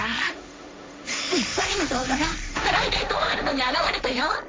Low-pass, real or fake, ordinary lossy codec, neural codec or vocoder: none; fake; none; codec, 16 kHz, 1.1 kbps, Voila-Tokenizer